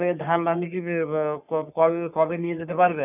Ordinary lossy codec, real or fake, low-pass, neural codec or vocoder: none; fake; 3.6 kHz; codec, 44.1 kHz, 3.4 kbps, Pupu-Codec